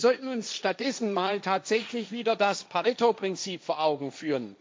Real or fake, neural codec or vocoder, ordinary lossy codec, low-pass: fake; codec, 16 kHz, 1.1 kbps, Voila-Tokenizer; none; none